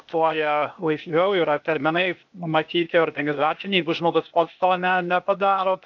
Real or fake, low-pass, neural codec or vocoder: fake; 7.2 kHz; codec, 16 kHz, 0.8 kbps, ZipCodec